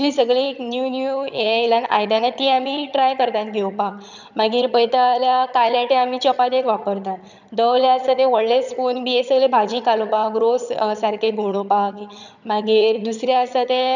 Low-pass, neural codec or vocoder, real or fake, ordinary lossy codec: 7.2 kHz; vocoder, 22.05 kHz, 80 mel bands, HiFi-GAN; fake; none